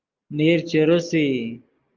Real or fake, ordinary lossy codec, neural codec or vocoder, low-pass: real; Opus, 32 kbps; none; 7.2 kHz